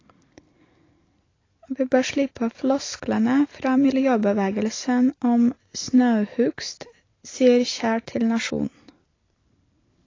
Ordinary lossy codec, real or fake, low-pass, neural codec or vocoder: AAC, 32 kbps; real; 7.2 kHz; none